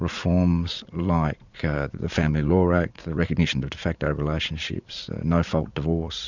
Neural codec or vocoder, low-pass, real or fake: vocoder, 44.1 kHz, 80 mel bands, Vocos; 7.2 kHz; fake